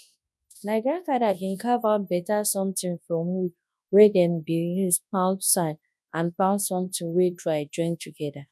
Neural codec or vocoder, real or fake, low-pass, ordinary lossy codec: codec, 24 kHz, 0.9 kbps, WavTokenizer, large speech release; fake; none; none